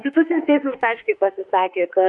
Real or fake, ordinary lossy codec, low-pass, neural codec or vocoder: fake; MP3, 64 kbps; 10.8 kHz; autoencoder, 48 kHz, 32 numbers a frame, DAC-VAE, trained on Japanese speech